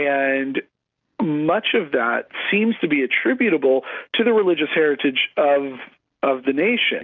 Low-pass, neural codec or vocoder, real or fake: 7.2 kHz; none; real